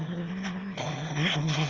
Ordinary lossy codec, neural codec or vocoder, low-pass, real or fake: Opus, 32 kbps; autoencoder, 22.05 kHz, a latent of 192 numbers a frame, VITS, trained on one speaker; 7.2 kHz; fake